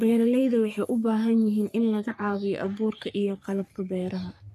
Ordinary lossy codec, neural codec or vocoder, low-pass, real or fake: none; codec, 44.1 kHz, 3.4 kbps, Pupu-Codec; 14.4 kHz; fake